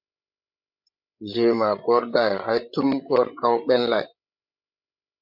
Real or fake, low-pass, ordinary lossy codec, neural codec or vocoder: fake; 5.4 kHz; MP3, 48 kbps; codec, 16 kHz, 16 kbps, FreqCodec, larger model